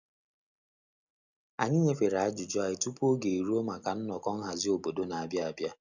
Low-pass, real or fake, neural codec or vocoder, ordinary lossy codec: 7.2 kHz; real; none; none